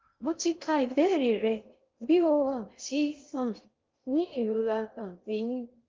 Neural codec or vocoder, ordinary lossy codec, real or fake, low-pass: codec, 16 kHz in and 24 kHz out, 0.6 kbps, FocalCodec, streaming, 4096 codes; Opus, 32 kbps; fake; 7.2 kHz